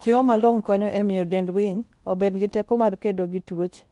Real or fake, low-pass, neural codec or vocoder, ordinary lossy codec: fake; 10.8 kHz; codec, 16 kHz in and 24 kHz out, 0.8 kbps, FocalCodec, streaming, 65536 codes; MP3, 64 kbps